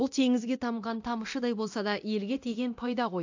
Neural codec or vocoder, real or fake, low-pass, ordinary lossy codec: codec, 24 kHz, 0.9 kbps, DualCodec; fake; 7.2 kHz; AAC, 48 kbps